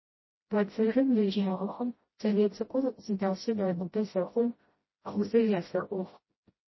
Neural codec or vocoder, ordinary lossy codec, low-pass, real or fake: codec, 16 kHz, 0.5 kbps, FreqCodec, smaller model; MP3, 24 kbps; 7.2 kHz; fake